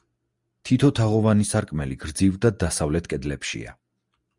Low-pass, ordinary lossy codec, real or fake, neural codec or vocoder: 10.8 kHz; Opus, 64 kbps; real; none